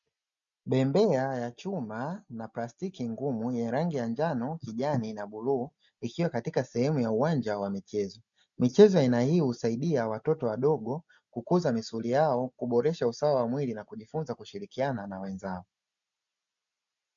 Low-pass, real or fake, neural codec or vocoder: 7.2 kHz; real; none